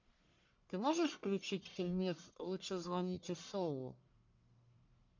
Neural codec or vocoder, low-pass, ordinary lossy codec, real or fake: codec, 44.1 kHz, 1.7 kbps, Pupu-Codec; 7.2 kHz; MP3, 64 kbps; fake